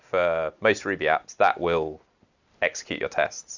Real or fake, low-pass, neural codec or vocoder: real; 7.2 kHz; none